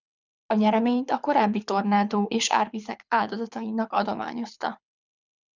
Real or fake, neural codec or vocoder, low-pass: fake; codec, 24 kHz, 6 kbps, HILCodec; 7.2 kHz